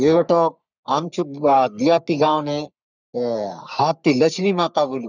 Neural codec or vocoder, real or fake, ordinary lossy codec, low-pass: codec, 44.1 kHz, 3.4 kbps, Pupu-Codec; fake; none; 7.2 kHz